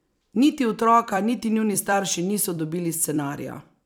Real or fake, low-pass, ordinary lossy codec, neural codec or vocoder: fake; none; none; vocoder, 44.1 kHz, 128 mel bands every 256 samples, BigVGAN v2